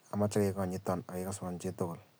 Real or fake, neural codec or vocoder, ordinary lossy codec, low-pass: real; none; none; none